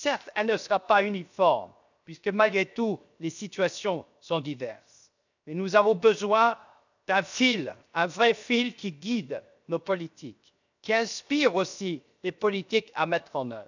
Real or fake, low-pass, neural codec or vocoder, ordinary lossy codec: fake; 7.2 kHz; codec, 16 kHz, 0.7 kbps, FocalCodec; none